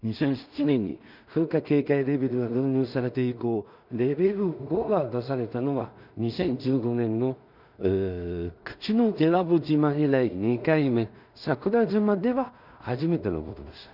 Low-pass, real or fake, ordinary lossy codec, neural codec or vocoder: 5.4 kHz; fake; none; codec, 16 kHz in and 24 kHz out, 0.4 kbps, LongCat-Audio-Codec, two codebook decoder